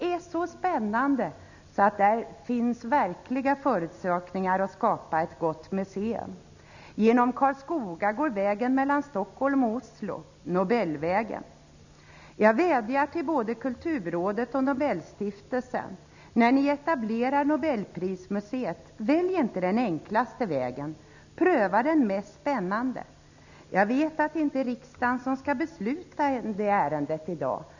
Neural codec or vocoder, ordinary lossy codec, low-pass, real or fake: none; none; 7.2 kHz; real